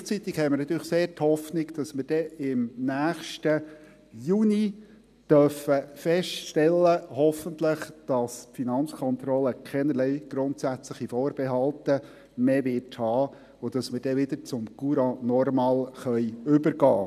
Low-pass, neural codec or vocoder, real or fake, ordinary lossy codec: 14.4 kHz; none; real; none